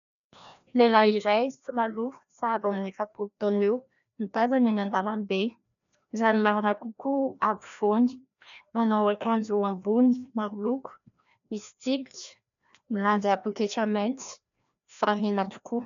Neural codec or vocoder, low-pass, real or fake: codec, 16 kHz, 1 kbps, FreqCodec, larger model; 7.2 kHz; fake